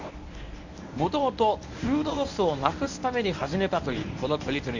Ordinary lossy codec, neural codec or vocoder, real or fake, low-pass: none; codec, 24 kHz, 0.9 kbps, WavTokenizer, medium speech release version 1; fake; 7.2 kHz